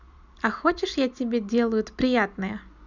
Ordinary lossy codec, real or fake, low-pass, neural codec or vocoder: none; real; 7.2 kHz; none